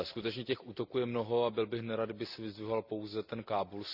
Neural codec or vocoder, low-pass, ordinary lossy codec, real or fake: none; 5.4 kHz; Opus, 64 kbps; real